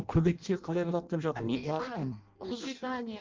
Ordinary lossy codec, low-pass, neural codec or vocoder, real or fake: Opus, 24 kbps; 7.2 kHz; codec, 16 kHz in and 24 kHz out, 0.6 kbps, FireRedTTS-2 codec; fake